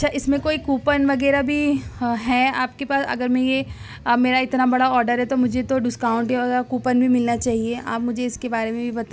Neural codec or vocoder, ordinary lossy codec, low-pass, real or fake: none; none; none; real